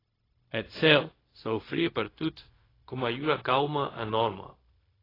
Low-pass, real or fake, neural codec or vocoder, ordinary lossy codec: 5.4 kHz; fake; codec, 16 kHz, 0.4 kbps, LongCat-Audio-Codec; AAC, 24 kbps